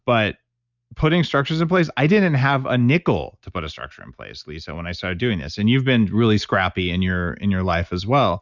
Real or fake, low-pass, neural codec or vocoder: real; 7.2 kHz; none